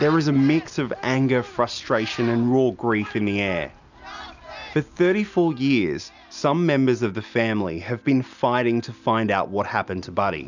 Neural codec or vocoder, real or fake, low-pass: none; real; 7.2 kHz